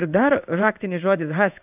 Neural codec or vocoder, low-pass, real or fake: codec, 16 kHz in and 24 kHz out, 1 kbps, XY-Tokenizer; 3.6 kHz; fake